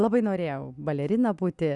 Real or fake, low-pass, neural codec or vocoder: real; 10.8 kHz; none